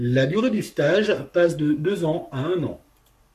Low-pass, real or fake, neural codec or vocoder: 14.4 kHz; fake; codec, 44.1 kHz, 7.8 kbps, Pupu-Codec